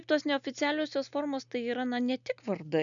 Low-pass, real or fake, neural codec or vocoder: 7.2 kHz; real; none